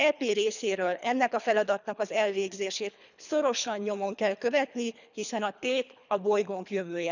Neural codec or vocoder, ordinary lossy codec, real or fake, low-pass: codec, 24 kHz, 3 kbps, HILCodec; none; fake; 7.2 kHz